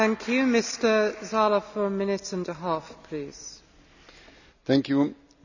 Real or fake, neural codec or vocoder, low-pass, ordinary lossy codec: real; none; 7.2 kHz; none